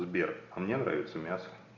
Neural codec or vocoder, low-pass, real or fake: none; 7.2 kHz; real